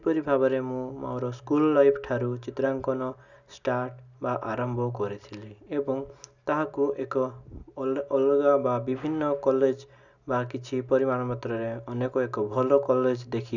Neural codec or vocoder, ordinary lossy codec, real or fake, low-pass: none; none; real; 7.2 kHz